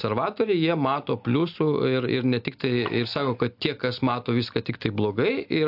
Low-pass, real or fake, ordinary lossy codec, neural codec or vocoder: 5.4 kHz; real; AAC, 48 kbps; none